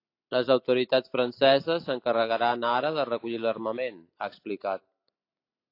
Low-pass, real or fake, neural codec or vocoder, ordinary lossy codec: 5.4 kHz; real; none; AAC, 32 kbps